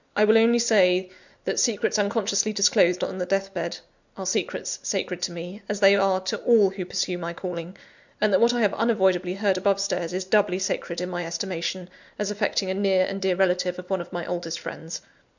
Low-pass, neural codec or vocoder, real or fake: 7.2 kHz; none; real